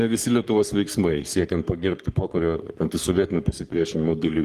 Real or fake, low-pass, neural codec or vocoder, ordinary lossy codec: fake; 14.4 kHz; codec, 44.1 kHz, 3.4 kbps, Pupu-Codec; Opus, 24 kbps